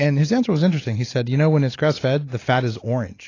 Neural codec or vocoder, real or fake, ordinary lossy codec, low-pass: none; real; AAC, 32 kbps; 7.2 kHz